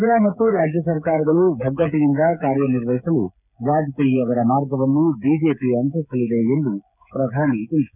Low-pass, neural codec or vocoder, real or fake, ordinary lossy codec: 3.6 kHz; codec, 16 kHz, 8 kbps, FreqCodec, smaller model; fake; none